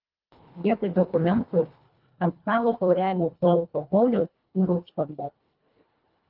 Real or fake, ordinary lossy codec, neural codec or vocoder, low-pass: fake; Opus, 24 kbps; codec, 24 kHz, 1.5 kbps, HILCodec; 5.4 kHz